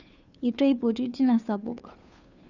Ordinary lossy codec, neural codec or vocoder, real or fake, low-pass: none; codec, 16 kHz, 2 kbps, FunCodec, trained on Chinese and English, 25 frames a second; fake; 7.2 kHz